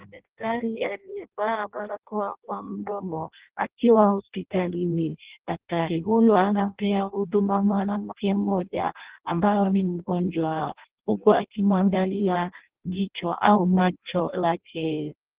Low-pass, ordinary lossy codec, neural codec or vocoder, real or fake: 3.6 kHz; Opus, 16 kbps; codec, 16 kHz in and 24 kHz out, 0.6 kbps, FireRedTTS-2 codec; fake